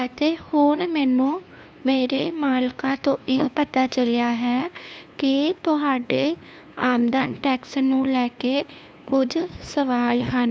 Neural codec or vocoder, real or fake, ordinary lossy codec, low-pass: codec, 16 kHz, 2 kbps, FunCodec, trained on LibriTTS, 25 frames a second; fake; none; none